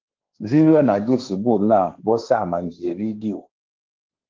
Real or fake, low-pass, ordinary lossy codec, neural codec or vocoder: fake; 7.2 kHz; Opus, 32 kbps; codec, 16 kHz, 1.1 kbps, Voila-Tokenizer